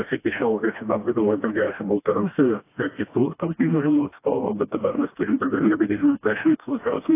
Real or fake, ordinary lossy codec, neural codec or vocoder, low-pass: fake; AAC, 24 kbps; codec, 16 kHz, 1 kbps, FreqCodec, smaller model; 3.6 kHz